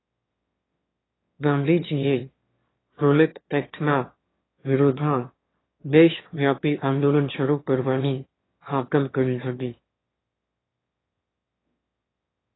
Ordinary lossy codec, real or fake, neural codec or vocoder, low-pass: AAC, 16 kbps; fake; autoencoder, 22.05 kHz, a latent of 192 numbers a frame, VITS, trained on one speaker; 7.2 kHz